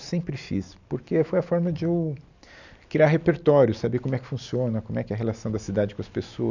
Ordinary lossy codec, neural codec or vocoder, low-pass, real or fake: none; none; 7.2 kHz; real